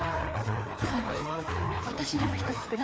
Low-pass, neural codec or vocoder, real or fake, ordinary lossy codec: none; codec, 16 kHz, 4 kbps, FreqCodec, larger model; fake; none